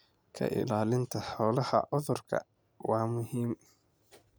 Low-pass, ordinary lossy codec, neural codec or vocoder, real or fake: none; none; none; real